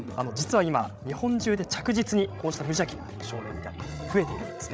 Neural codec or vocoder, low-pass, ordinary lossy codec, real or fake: codec, 16 kHz, 16 kbps, FreqCodec, larger model; none; none; fake